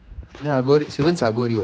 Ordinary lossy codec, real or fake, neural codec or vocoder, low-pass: none; fake; codec, 16 kHz, 2 kbps, X-Codec, HuBERT features, trained on general audio; none